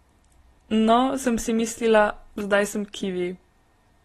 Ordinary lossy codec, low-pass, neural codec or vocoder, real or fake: AAC, 32 kbps; 19.8 kHz; none; real